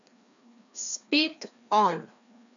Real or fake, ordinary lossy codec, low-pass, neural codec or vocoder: fake; MP3, 96 kbps; 7.2 kHz; codec, 16 kHz, 2 kbps, FreqCodec, larger model